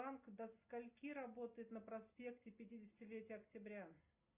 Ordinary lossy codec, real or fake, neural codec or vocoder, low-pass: Opus, 64 kbps; real; none; 3.6 kHz